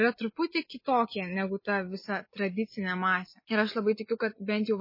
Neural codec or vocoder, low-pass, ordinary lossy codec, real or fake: none; 5.4 kHz; MP3, 24 kbps; real